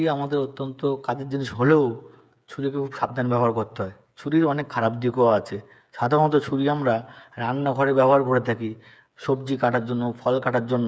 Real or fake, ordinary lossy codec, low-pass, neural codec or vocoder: fake; none; none; codec, 16 kHz, 8 kbps, FreqCodec, smaller model